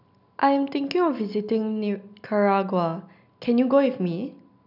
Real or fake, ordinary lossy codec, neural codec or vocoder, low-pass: real; none; none; 5.4 kHz